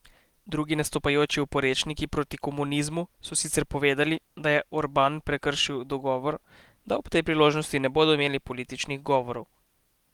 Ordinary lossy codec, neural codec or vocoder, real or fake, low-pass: Opus, 24 kbps; none; real; 19.8 kHz